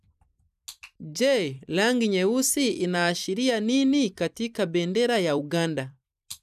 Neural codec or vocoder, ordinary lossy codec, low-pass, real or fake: vocoder, 44.1 kHz, 128 mel bands every 256 samples, BigVGAN v2; none; 14.4 kHz; fake